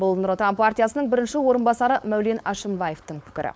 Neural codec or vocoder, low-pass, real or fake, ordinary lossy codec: codec, 16 kHz, 4.8 kbps, FACodec; none; fake; none